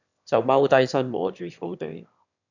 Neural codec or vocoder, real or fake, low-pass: autoencoder, 22.05 kHz, a latent of 192 numbers a frame, VITS, trained on one speaker; fake; 7.2 kHz